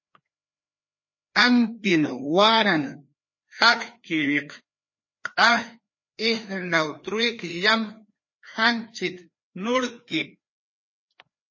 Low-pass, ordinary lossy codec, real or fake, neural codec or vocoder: 7.2 kHz; MP3, 32 kbps; fake; codec, 16 kHz, 2 kbps, FreqCodec, larger model